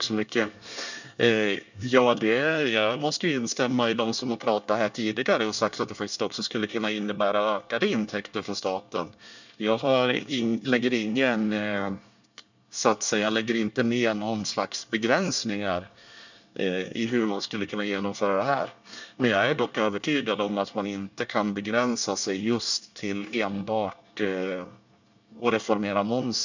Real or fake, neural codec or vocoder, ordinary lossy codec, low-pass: fake; codec, 24 kHz, 1 kbps, SNAC; none; 7.2 kHz